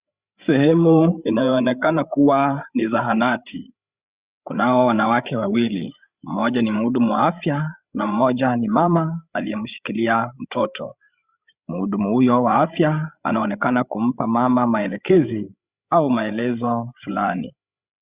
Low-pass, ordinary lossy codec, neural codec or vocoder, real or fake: 3.6 kHz; Opus, 64 kbps; codec, 16 kHz, 8 kbps, FreqCodec, larger model; fake